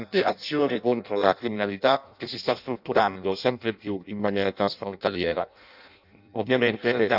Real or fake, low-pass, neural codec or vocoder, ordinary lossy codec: fake; 5.4 kHz; codec, 16 kHz in and 24 kHz out, 0.6 kbps, FireRedTTS-2 codec; none